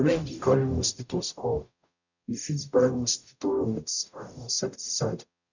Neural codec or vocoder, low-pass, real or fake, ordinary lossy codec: codec, 44.1 kHz, 0.9 kbps, DAC; 7.2 kHz; fake; none